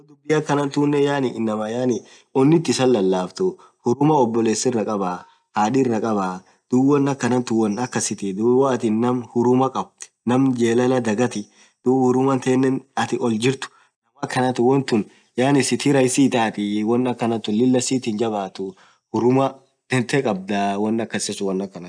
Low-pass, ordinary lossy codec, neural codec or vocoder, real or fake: 9.9 kHz; none; none; real